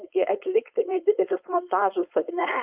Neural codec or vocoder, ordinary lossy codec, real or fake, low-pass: codec, 16 kHz, 4.8 kbps, FACodec; Opus, 24 kbps; fake; 3.6 kHz